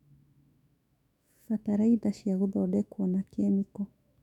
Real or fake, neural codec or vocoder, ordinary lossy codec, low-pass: fake; autoencoder, 48 kHz, 128 numbers a frame, DAC-VAE, trained on Japanese speech; none; 19.8 kHz